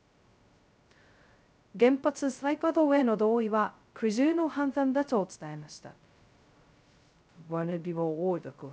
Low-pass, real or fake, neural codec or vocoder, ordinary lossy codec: none; fake; codec, 16 kHz, 0.2 kbps, FocalCodec; none